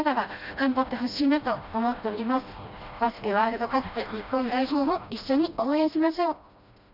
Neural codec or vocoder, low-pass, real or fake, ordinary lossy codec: codec, 16 kHz, 1 kbps, FreqCodec, smaller model; 5.4 kHz; fake; none